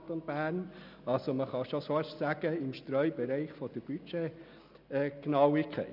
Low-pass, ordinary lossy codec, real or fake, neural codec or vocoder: 5.4 kHz; none; real; none